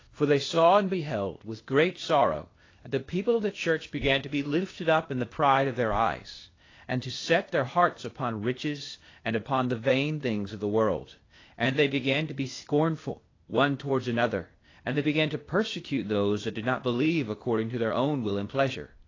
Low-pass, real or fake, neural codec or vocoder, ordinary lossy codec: 7.2 kHz; fake; codec, 16 kHz, 0.8 kbps, ZipCodec; AAC, 32 kbps